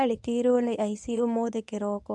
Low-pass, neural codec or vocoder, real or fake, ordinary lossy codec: none; codec, 24 kHz, 0.9 kbps, WavTokenizer, medium speech release version 1; fake; none